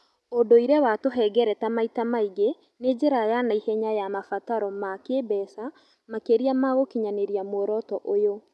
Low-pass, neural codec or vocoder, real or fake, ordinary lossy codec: none; none; real; none